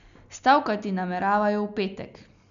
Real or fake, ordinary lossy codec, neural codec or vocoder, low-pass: real; none; none; 7.2 kHz